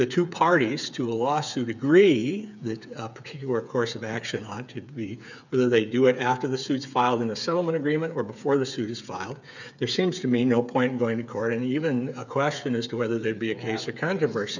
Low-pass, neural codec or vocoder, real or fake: 7.2 kHz; codec, 16 kHz, 8 kbps, FreqCodec, smaller model; fake